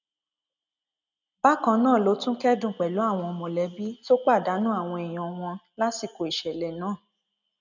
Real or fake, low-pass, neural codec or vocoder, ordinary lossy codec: real; 7.2 kHz; none; none